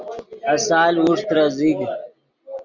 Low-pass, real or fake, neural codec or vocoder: 7.2 kHz; real; none